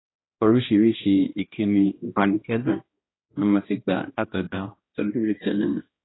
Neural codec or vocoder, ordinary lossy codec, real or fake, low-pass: codec, 16 kHz, 1 kbps, X-Codec, HuBERT features, trained on balanced general audio; AAC, 16 kbps; fake; 7.2 kHz